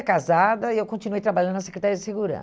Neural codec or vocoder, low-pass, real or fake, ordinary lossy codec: none; none; real; none